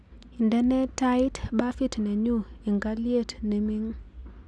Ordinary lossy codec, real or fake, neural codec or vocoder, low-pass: none; real; none; none